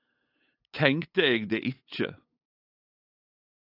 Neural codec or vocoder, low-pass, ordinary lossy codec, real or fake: codec, 16 kHz, 8 kbps, FunCodec, trained on LibriTTS, 25 frames a second; 5.4 kHz; AAC, 32 kbps; fake